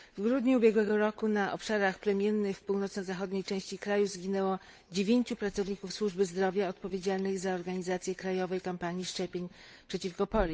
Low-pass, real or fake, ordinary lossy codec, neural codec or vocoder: none; fake; none; codec, 16 kHz, 8 kbps, FunCodec, trained on Chinese and English, 25 frames a second